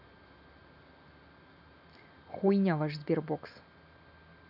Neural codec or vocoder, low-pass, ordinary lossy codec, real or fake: none; 5.4 kHz; none; real